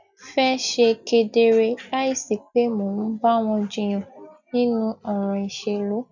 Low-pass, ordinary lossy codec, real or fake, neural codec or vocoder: 7.2 kHz; none; real; none